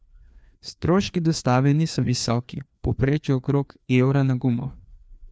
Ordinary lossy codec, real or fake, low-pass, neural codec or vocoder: none; fake; none; codec, 16 kHz, 2 kbps, FreqCodec, larger model